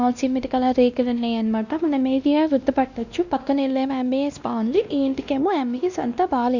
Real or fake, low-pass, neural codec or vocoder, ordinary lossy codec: fake; 7.2 kHz; codec, 16 kHz, 1 kbps, X-Codec, WavLM features, trained on Multilingual LibriSpeech; none